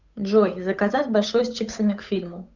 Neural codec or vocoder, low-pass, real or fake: codec, 16 kHz, 8 kbps, FunCodec, trained on Chinese and English, 25 frames a second; 7.2 kHz; fake